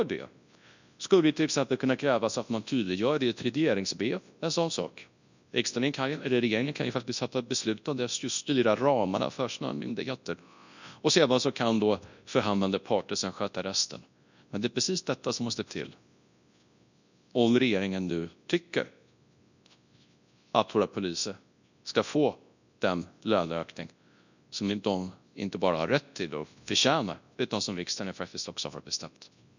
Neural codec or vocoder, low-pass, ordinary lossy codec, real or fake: codec, 24 kHz, 0.9 kbps, WavTokenizer, large speech release; 7.2 kHz; none; fake